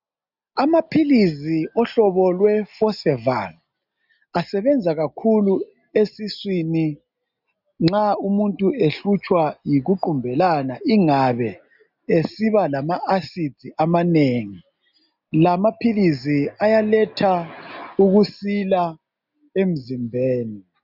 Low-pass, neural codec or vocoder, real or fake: 5.4 kHz; none; real